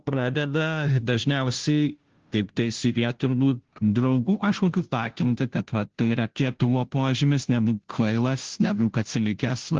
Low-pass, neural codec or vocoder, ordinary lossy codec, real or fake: 7.2 kHz; codec, 16 kHz, 0.5 kbps, FunCodec, trained on Chinese and English, 25 frames a second; Opus, 16 kbps; fake